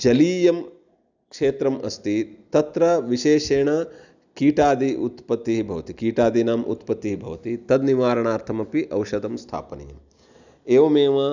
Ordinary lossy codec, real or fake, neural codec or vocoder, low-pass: none; real; none; 7.2 kHz